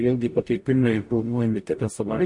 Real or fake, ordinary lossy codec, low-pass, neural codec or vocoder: fake; MP3, 48 kbps; 10.8 kHz; codec, 44.1 kHz, 0.9 kbps, DAC